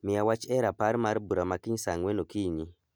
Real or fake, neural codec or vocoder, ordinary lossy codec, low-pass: real; none; none; none